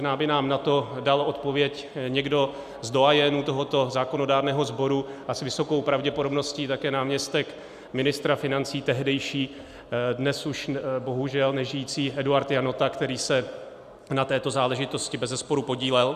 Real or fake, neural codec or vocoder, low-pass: real; none; 14.4 kHz